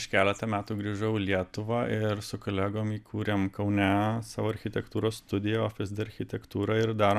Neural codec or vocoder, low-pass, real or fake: none; 14.4 kHz; real